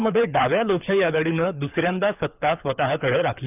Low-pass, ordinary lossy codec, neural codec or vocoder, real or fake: 3.6 kHz; none; codec, 24 kHz, 6 kbps, HILCodec; fake